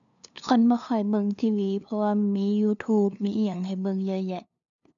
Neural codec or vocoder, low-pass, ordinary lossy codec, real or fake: codec, 16 kHz, 2 kbps, FunCodec, trained on LibriTTS, 25 frames a second; 7.2 kHz; none; fake